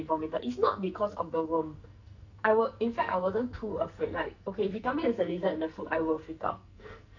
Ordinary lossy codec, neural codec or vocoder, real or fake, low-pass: none; codec, 44.1 kHz, 2.6 kbps, SNAC; fake; 7.2 kHz